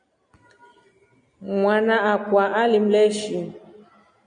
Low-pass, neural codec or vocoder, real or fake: 9.9 kHz; none; real